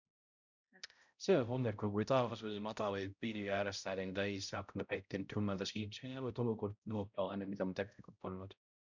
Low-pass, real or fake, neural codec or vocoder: 7.2 kHz; fake; codec, 16 kHz, 0.5 kbps, X-Codec, HuBERT features, trained on balanced general audio